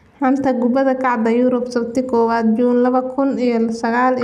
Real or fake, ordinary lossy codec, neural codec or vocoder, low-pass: real; none; none; 14.4 kHz